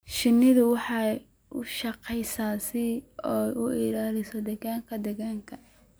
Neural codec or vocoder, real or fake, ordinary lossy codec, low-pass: none; real; none; none